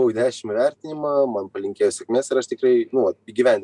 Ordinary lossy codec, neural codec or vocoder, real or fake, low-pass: MP3, 96 kbps; none; real; 10.8 kHz